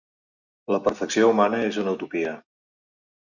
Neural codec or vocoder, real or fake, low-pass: none; real; 7.2 kHz